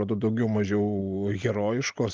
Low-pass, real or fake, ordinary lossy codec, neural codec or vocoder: 7.2 kHz; real; Opus, 24 kbps; none